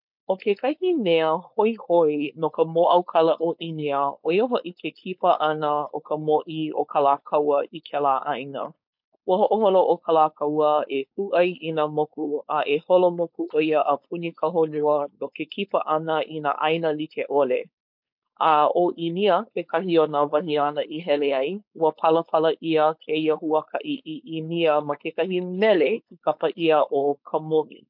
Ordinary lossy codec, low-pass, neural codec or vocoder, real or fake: MP3, 48 kbps; 5.4 kHz; codec, 16 kHz, 4.8 kbps, FACodec; fake